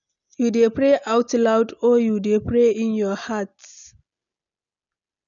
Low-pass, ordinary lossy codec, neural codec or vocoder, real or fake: 7.2 kHz; none; none; real